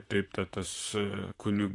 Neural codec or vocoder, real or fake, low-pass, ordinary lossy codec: vocoder, 44.1 kHz, 128 mel bands, Pupu-Vocoder; fake; 10.8 kHz; AAC, 48 kbps